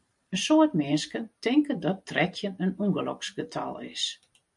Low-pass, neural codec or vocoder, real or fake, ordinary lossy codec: 10.8 kHz; vocoder, 44.1 kHz, 128 mel bands every 512 samples, BigVGAN v2; fake; MP3, 64 kbps